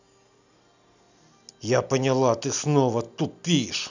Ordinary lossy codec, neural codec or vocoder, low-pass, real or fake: none; none; 7.2 kHz; real